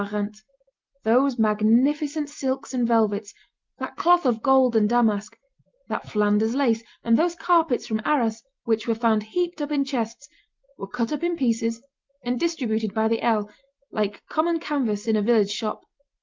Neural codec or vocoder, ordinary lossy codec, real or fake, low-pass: none; Opus, 24 kbps; real; 7.2 kHz